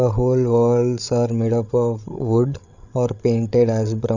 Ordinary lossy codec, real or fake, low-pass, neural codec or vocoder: none; fake; 7.2 kHz; codec, 16 kHz, 8 kbps, FreqCodec, larger model